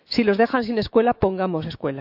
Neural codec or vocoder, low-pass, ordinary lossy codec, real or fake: autoencoder, 48 kHz, 128 numbers a frame, DAC-VAE, trained on Japanese speech; 5.4 kHz; none; fake